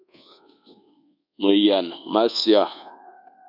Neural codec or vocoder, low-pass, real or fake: codec, 24 kHz, 1.2 kbps, DualCodec; 5.4 kHz; fake